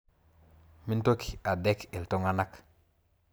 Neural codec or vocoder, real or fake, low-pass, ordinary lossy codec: none; real; none; none